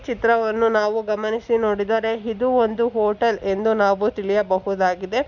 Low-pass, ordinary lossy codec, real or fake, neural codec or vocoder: 7.2 kHz; none; real; none